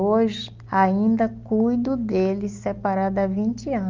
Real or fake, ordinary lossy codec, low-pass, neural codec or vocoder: real; Opus, 24 kbps; 7.2 kHz; none